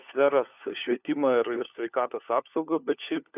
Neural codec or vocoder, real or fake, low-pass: codec, 16 kHz, 4 kbps, FunCodec, trained on LibriTTS, 50 frames a second; fake; 3.6 kHz